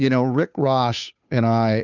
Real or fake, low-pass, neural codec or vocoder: fake; 7.2 kHz; codec, 16 kHz, 2 kbps, FunCodec, trained on Chinese and English, 25 frames a second